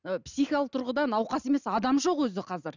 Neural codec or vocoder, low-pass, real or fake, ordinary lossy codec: none; 7.2 kHz; real; none